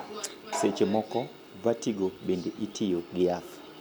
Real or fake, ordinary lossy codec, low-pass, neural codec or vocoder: real; none; none; none